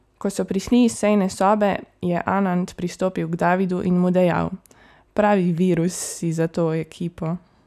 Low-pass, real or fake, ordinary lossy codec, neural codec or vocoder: 14.4 kHz; real; none; none